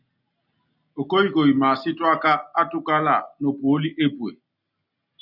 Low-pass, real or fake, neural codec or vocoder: 5.4 kHz; real; none